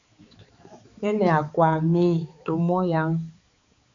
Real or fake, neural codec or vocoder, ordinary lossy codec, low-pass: fake; codec, 16 kHz, 4 kbps, X-Codec, HuBERT features, trained on balanced general audio; AAC, 64 kbps; 7.2 kHz